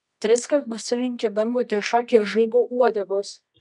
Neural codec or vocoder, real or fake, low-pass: codec, 24 kHz, 0.9 kbps, WavTokenizer, medium music audio release; fake; 10.8 kHz